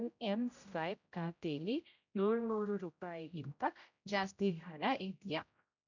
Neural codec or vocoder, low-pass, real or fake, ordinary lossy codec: codec, 16 kHz, 0.5 kbps, X-Codec, HuBERT features, trained on general audio; 7.2 kHz; fake; none